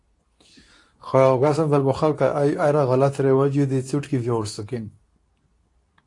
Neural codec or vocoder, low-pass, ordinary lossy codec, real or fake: codec, 24 kHz, 0.9 kbps, WavTokenizer, medium speech release version 2; 10.8 kHz; AAC, 48 kbps; fake